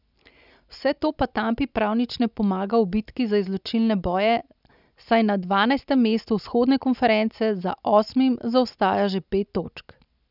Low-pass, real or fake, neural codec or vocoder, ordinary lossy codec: 5.4 kHz; real; none; none